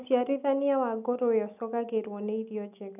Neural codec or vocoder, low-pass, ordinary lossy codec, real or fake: none; 3.6 kHz; none; real